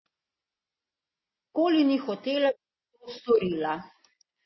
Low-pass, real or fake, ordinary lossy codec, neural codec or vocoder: 7.2 kHz; real; MP3, 24 kbps; none